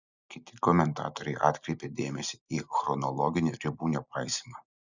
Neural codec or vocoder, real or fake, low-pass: none; real; 7.2 kHz